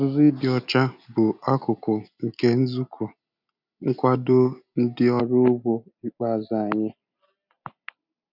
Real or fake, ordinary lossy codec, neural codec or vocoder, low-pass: real; none; none; 5.4 kHz